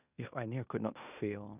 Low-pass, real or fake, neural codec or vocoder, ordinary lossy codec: 3.6 kHz; fake; codec, 16 kHz in and 24 kHz out, 0.9 kbps, LongCat-Audio-Codec, four codebook decoder; none